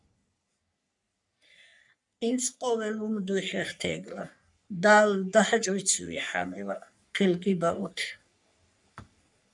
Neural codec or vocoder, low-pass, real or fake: codec, 44.1 kHz, 3.4 kbps, Pupu-Codec; 10.8 kHz; fake